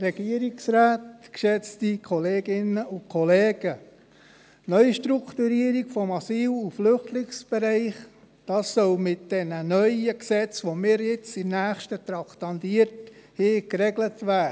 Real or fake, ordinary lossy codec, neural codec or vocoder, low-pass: real; none; none; none